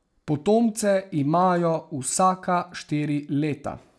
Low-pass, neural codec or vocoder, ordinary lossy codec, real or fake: none; none; none; real